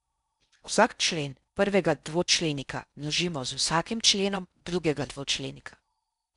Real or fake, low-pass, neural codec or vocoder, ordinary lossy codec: fake; 10.8 kHz; codec, 16 kHz in and 24 kHz out, 0.6 kbps, FocalCodec, streaming, 4096 codes; none